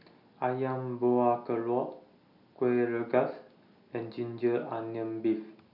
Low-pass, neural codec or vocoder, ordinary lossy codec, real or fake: 5.4 kHz; none; none; real